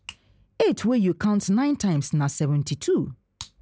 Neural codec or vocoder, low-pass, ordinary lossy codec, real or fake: none; none; none; real